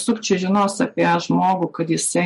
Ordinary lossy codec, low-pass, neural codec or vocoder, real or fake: MP3, 48 kbps; 14.4 kHz; none; real